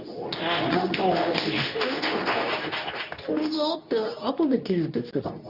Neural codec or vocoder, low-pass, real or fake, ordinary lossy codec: codec, 24 kHz, 0.9 kbps, WavTokenizer, medium speech release version 2; 5.4 kHz; fake; none